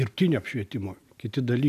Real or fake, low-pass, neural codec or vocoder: real; 14.4 kHz; none